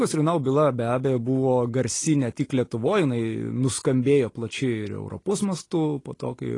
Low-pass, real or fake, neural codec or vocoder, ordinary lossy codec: 10.8 kHz; real; none; AAC, 32 kbps